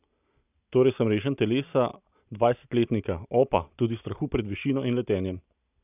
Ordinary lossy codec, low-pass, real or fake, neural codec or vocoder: none; 3.6 kHz; real; none